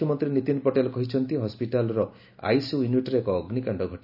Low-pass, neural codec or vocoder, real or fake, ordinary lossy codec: 5.4 kHz; none; real; none